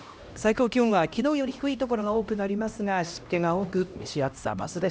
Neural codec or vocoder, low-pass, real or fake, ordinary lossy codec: codec, 16 kHz, 1 kbps, X-Codec, HuBERT features, trained on LibriSpeech; none; fake; none